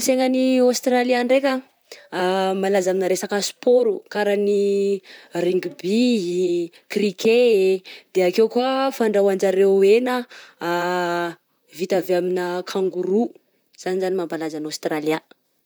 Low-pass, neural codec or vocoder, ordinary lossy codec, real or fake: none; vocoder, 44.1 kHz, 128 mel bands, Pupu-Vocoder; none; fake